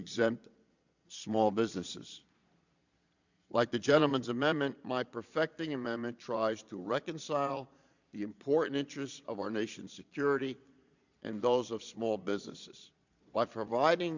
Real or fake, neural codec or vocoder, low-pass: fake; vocoder, 22.05 kHz, 80 mel bands, Vocos; 7.2 kHz